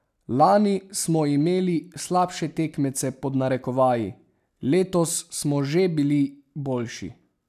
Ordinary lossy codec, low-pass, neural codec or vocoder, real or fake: none; 14.4 kHz; none; real